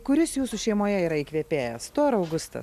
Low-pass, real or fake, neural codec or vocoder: 14.4 kHz; real; none